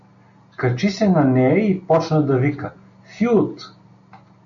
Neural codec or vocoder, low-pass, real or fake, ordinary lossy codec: none; 7.2 kHz; real; AAC, 64 kbps